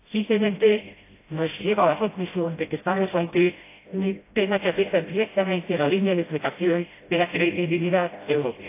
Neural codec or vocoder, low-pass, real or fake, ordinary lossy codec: codec, 16 kHz, 0.5 kbps, FreqCodec, smaller model; 3.6 kHz; fake; AAC, 24 kbps